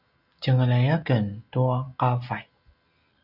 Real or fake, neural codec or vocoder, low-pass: real; none; 5.4 kHz